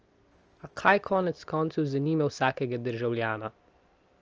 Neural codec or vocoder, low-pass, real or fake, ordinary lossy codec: none; 7.2 kHz; real; Opus, 24 kbps